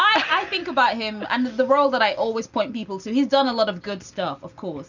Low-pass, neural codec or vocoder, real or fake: 7.2 kHz; none; real